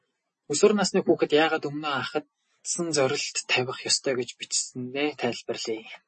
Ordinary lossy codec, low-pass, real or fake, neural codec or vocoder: MP3, 32 kbps; 10.8 kHz; real; none